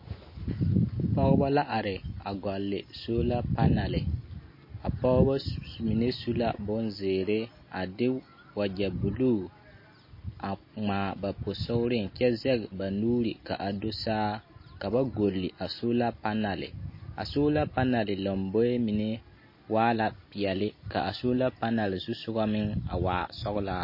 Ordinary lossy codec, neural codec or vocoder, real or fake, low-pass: MP3, 24 kbps; none; real; 5.4 kHz